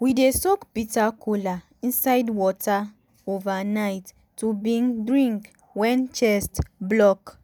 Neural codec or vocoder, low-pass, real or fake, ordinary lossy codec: none; none; real; none